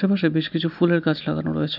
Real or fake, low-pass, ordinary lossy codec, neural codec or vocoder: real; 5.4 kHz; none; none